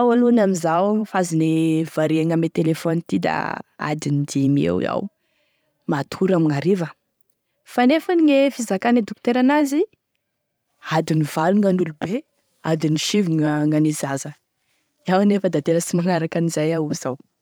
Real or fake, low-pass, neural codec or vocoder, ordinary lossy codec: fake; none; vocoder, 44.1 kHz, 128 mel bands every 512 samples, BigVGAN v2; none